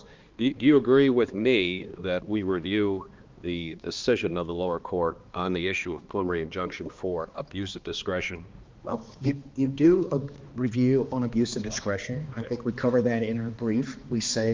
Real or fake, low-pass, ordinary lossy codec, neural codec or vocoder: fake; 7.2 kHz; Opus, 24 kbps; codec, 16 kHz, 2 kbps, X-Codec, HuBERT features, trained on balanced general audio